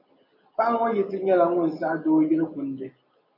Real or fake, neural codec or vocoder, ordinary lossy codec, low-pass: real; none; AAC, 48 kbps; 5.4 kHz